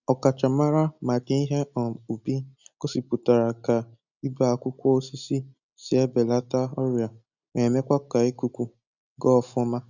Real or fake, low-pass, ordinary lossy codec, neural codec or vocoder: real; 7.2 kHz; none; none